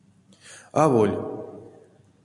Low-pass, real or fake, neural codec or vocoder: 10.8 kHz; real; none